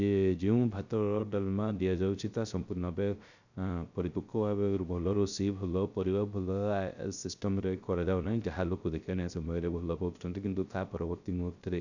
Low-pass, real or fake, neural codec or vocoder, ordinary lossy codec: 7.2 kHz; fake; codec, 16 kHz, 0.3 kbps, FocalCodec; none